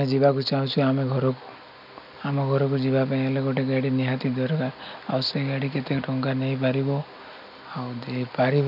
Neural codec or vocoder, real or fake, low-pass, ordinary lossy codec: none; real; 5.4 kHz; none